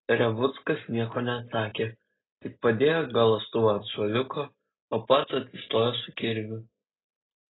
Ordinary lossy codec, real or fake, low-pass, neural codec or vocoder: AAC, 16 kbps; real; 7.2 kHz; none